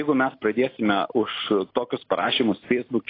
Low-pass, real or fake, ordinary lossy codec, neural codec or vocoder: 5.4 kHz; real; AAC, 24 kbps; none